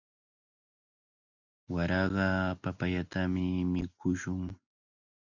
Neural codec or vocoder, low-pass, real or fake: none; 7.2 kHz; real